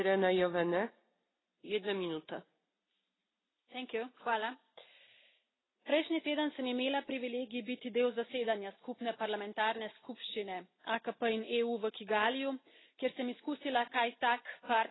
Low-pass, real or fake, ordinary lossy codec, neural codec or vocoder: 7.2 kHz; real; AAC, 16 kbps; none